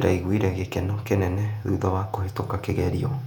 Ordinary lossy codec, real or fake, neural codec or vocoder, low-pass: none; fake; vocoder, 48 kHz, 128 mel bands, Vocos; 19.8 kHz